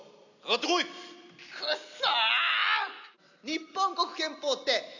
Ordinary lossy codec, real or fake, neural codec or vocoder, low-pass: none; real; none; 7.2 kHz